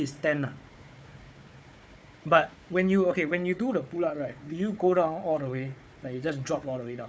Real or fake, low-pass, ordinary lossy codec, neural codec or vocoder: fake; none; none; codec, 16 kHz, 4 kbps, FunCodec, trained on Chinese and English, 50 frames a second